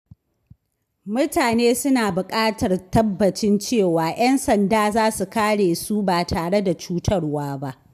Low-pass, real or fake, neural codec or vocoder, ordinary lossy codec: 14.4 kHz; real; none; none